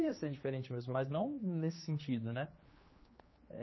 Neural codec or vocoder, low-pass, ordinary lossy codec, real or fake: codec, 16 kHz, 2 kbps, X-Codec, HuBERT features, trained on general audio; 7.2 kHz; MP3, 24 kbps; fake